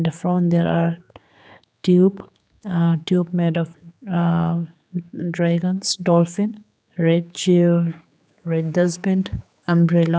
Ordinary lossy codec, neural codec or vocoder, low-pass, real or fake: none; codec, 16 kHz, 4 kbps, X-Codec, HuBERT features, trained on general audio; none; fake